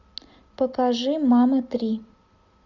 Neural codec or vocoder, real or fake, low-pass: none; real; 7.2 kHz